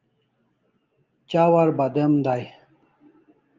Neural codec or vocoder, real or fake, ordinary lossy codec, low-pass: none; real; Opus, 24 kbps; 7.2 kHz